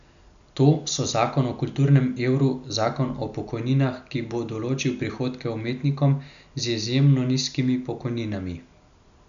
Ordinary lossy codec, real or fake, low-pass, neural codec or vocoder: none; real; 7.2 kHz; none